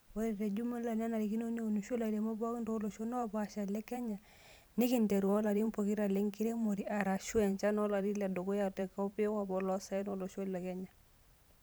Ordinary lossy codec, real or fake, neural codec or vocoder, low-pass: none; fake; vocoder, 44.1 kHz, 128 mel bands every 512 samples, BigVGAN v2; none